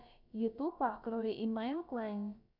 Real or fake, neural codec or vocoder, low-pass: fake; codec, 16 kHz, about 1 kbps, DyCAST, with the encoder's durations; 5.4 kHz